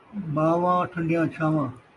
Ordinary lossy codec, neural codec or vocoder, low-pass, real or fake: AAC, 64 kbps; none; 10.8 kHz; real